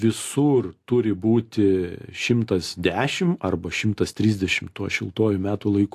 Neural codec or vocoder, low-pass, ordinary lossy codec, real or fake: none; 14.4 kHz; AAC, 64 kbps; real